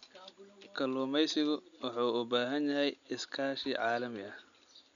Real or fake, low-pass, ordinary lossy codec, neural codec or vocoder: real; 7.2 kHz; none; none